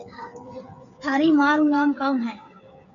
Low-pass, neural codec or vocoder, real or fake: 7.2 kHz; codec, 16 kHz, 8 kbps, FreqCodec, smaller model; fake